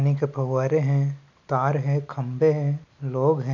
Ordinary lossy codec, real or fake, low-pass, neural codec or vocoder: none; real; 7.2 kHz; none